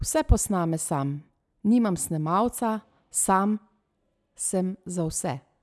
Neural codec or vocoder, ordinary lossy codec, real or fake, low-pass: none; none; real; none